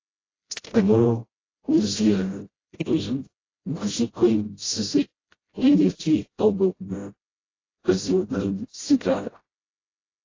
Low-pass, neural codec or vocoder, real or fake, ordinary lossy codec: 7.2 kHz; codec, 16 kHz, 0.5 kbps, FreqCodec, smaller model; fake; AAC, 32 kbps